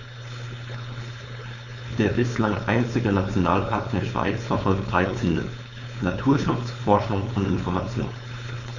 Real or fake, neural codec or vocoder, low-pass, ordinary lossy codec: fake; codec, 16 kHz, 4.8 kbps, FACodec; 7.2 kHz; none